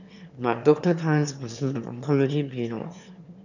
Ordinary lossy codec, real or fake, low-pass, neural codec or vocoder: none; fake; 7.2 kHz; autoencoder, 22.05 kHz, a latent of 192 numbers a frame, VITS, trained on one speaker